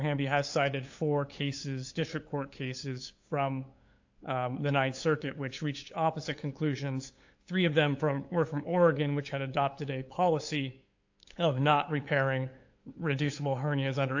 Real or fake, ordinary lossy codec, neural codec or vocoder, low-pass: fake; AAC, 48 kbps; codec, 16 kHz, 8 kbps, FunCodec, trained on LibriTTS, 25 frames a second; 7.2 kHz